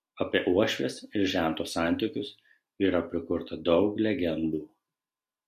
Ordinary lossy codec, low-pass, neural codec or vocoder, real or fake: MP3, 64 kbps; 14.4 kHz; none; real